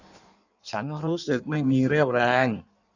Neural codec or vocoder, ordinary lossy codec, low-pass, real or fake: codec, 16 kHz in and 24 kHz out, 1.1 kbps, FireRedTTS-2 codec; none; 7.2 kHz; fake